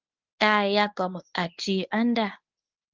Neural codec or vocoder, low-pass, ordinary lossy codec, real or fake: codec, 24 kHz, 0.9 kbps, WavTokenizer, medium speech release version 1; 7.2 kHz; Opus, 32 kbps; fake